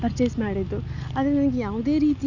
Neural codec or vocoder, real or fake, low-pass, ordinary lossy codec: none; real; 7.2 kHz; none